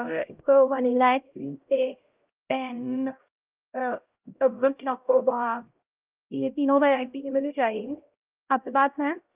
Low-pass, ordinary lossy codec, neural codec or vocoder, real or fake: 3.6 kHz; Opus, 32 kbps; codec, 16 kHz, 0.5 kbps, X-Codec, HuBERT features, trained on LibriSpeech; fake